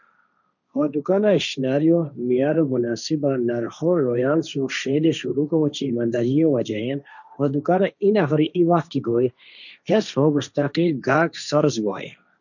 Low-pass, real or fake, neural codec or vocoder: 7.2 kHz; fake; codec, 16 kHz, 1.1 kbps, Voila-Tokenizer